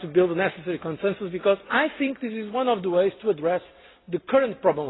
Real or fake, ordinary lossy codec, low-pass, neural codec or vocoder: real; AAC, 16 kbps; 7.2 kHz; none